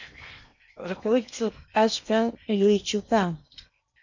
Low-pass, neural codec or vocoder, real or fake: 7.2 kHz; codec, 16 kHz in and 24 kHz out, 0.8 kbps, FocalCodec, streaming, 65536 codes; fake